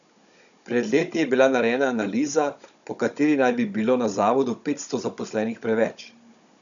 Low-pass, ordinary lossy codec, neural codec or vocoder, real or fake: 7.2 kHz; none; codec, 16 kHz, 16 kbps, FunCodec, trained on Chinese and English, 50 frames a second; fake